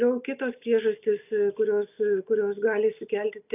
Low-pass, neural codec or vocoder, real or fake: 3.6 kHz; none; real